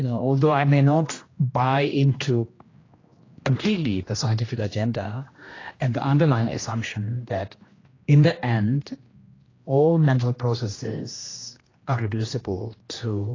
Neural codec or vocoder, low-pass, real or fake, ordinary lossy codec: codec, 16 kHz, 1 kbps, X-Codec, HuBERT features, trained on general audio; 7.2 kHz; fake; AAC, 32 kbps